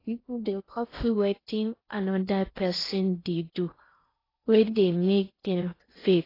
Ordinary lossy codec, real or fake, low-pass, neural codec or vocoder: AAC, 24 kbps; fake; 5.4 kHz; codec, 16 kHz in and 24 kHz out, 0.6 kbps, FocalCodec, streaming, 2048 codes